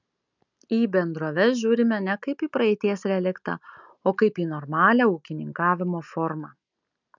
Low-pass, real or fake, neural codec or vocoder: 7.2 kHz; real; none